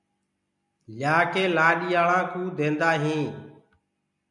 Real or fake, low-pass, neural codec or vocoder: real; 10.8 kHz; none